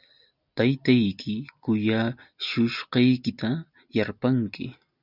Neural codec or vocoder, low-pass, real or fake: none; 5.4 kHz; real